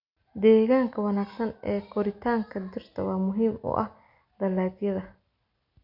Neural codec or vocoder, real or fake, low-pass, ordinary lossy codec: none; real; 5.4 kHz; none